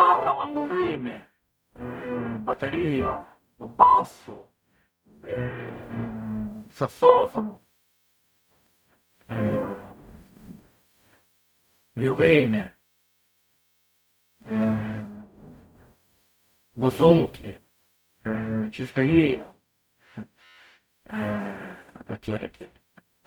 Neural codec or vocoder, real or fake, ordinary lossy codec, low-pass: codec, 44.1 kHz, 0.9 kbps, DAC; fake; none; none